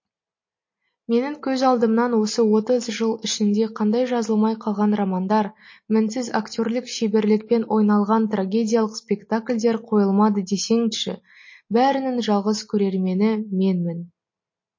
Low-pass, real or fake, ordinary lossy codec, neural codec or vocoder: 7.2 kHz; real; MP3, 32 kbps; none